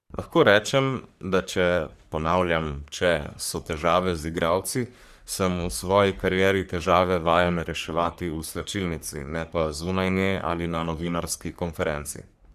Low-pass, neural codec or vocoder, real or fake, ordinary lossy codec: 14.4 kHz; codec, 44.1 kHz, 3.4 kbps, Pupu-Codec; fake; Opus, 64 kbps